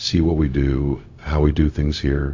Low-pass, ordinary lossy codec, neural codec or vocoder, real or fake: 7.2 kHz; AAC, 48 kbps; codec, 16 kHz, 0.4 kbps, LongCat-Audio-Codec; fake